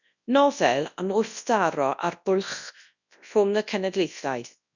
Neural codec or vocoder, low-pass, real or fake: codec, 24 kHz, 0.9 kbps, WavTokenizer, large speech release; 7.2 kHz; fake